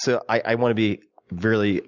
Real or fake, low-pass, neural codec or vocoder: real; 7.2 kHz; none